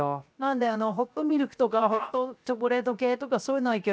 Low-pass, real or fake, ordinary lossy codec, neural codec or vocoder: none; fake; none; codec, 16 kHz, 0.7 kbps, FocalCodec